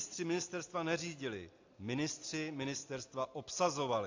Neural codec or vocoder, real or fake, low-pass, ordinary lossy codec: none; real; 7.2 kHz; AAC, 48 kbps